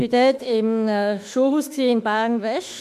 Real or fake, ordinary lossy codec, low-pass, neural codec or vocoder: fake; MP3, 96 kbps; 14.4 kHz; autoencoder, 48 kHz, 32 numbers a frame, DAC-VAE, trained on Japanese speech